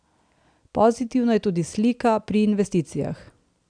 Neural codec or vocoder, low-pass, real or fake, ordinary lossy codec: none; 9.9 kHz; real; none